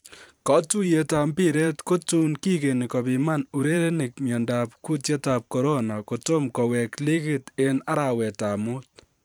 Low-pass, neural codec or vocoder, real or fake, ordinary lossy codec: none; vocoder, 44.1 kHz, 128 mel bands every 512 samples, BigVGAN v2; fake; none